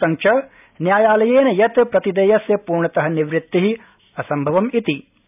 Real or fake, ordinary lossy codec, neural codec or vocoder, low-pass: real; none; none; 3.6 kHz